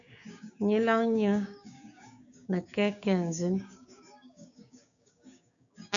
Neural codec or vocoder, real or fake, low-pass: codec, 16 kHz, 6 kbps, DAC; fake; 7.2 kHz